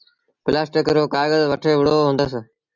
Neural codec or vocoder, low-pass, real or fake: none; 7.2 kHz; real